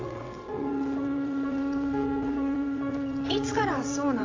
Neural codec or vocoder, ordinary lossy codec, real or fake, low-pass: vocoder, 22.05 kHz, 80 mel bands, WaveNeXt; AAC, 32 kbps; fake; 7.2 kHz